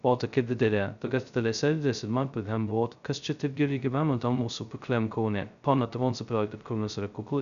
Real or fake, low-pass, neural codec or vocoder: fake; 7.2 kHz; codec, 16 kHz, 0.2 kbps, FocalCodec